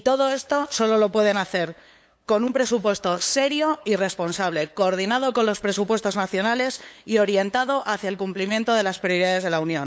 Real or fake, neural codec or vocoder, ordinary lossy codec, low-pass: fake; codec, 16 kHz, 8 kbps, FunCodec, trained on LibriTTS, 25 frames a second; none; none